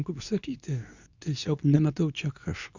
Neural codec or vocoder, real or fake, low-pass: codec, 24 kHz, 0.9 kbps, WavTokenizer, medium speech release version 2; fake; 7.2 kHz